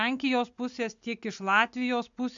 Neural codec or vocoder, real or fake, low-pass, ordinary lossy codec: none; real; 7.2 kHz; MP3, 64 kbps